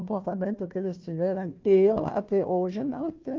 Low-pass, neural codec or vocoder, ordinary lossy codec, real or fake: 7.2 kHz; codec, 16 kHz, 1 kbps, FunCodec, trained on Chinese and English, 50 frames a second; Opus, 32 kbps; fake